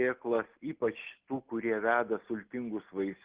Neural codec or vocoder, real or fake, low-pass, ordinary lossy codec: none; real; 3.6 kHz; Opus, 16 kbps